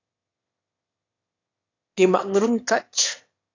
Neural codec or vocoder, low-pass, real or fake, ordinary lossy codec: autoencoder, 22.05 kHz, a latent of 192 numbers a frame, VITS, trained on one speaker; 7.2 kHz; fake; AAC, 32 kbps